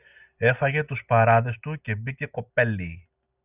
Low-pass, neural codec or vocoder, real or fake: 3.6 kHz; none; real